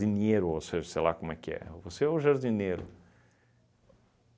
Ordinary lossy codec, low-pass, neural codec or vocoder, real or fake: none; none; none; real